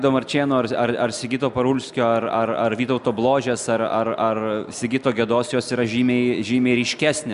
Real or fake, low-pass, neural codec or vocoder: real; 10.8 kHz; none